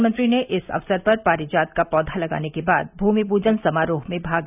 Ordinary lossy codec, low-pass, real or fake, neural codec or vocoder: none; 3.6 kHz; real; none